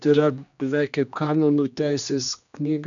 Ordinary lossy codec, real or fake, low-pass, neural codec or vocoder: MP3, 96 kbps; fake; 7.2 kHz; codec, 16 kHz, 2 kbps, X-Codec, HuBERT features, trained on general audio